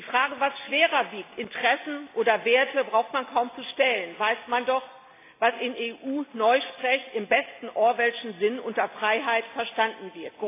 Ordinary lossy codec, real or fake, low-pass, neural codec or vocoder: AAC, 24 kbps; real; 3.6 kHz; none